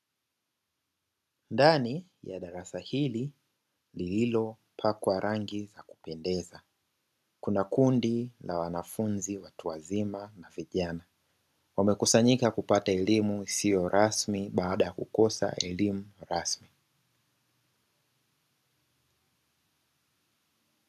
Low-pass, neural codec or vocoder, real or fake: 14.4 kHz; none; real